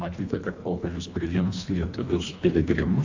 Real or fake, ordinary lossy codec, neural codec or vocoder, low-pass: fake; AAC, 48 kbps; codec, 24 kHz, 1.5 kbps, HILCodec; 7.2 kHz